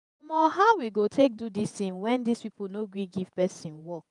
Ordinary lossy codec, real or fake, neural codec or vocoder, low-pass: none; fake; vocoder, 48 kHz, 128 mel bands, Vocos; 10.8 kHz